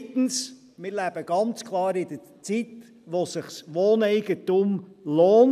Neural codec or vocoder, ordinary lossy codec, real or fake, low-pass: none; none; real; 14.4 kHz